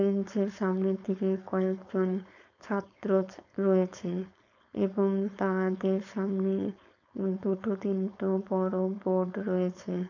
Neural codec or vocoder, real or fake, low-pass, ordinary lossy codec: codec, 16 kHz, 4.8 kbps, FACodec; fake; 7.2 kHz; none